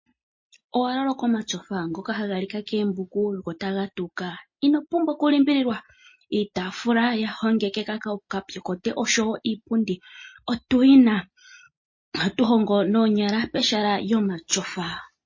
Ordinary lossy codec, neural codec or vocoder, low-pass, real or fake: MP3, 32 kbps; none; 7.2 kHz; real